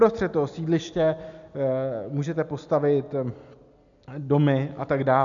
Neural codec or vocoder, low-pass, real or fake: none; 7.2 kHz; real